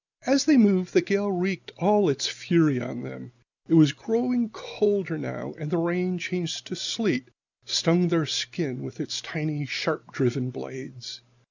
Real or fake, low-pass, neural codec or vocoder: real; 7.2 kHz; none